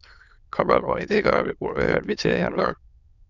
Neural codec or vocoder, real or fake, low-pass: autoencoder, 22.05 kHz, a latent of 192 numbers a frame, VITS, trained on many speakers; fake; 7.2 kHz